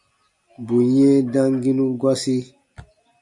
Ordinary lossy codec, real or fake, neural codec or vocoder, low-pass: MP3, 96 kbps; real; none; 10.8 kHz